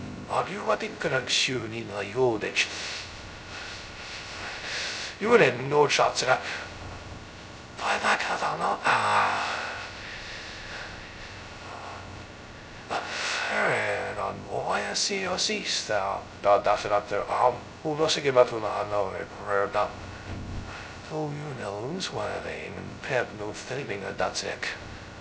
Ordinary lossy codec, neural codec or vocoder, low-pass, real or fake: none; codec, 16 kHz, 0.2 kbps, FocalCodec; none; fake